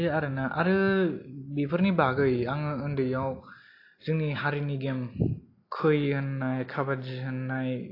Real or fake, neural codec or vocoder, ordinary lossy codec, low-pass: real; none; AAC, 32 kbps; 5.4 kHz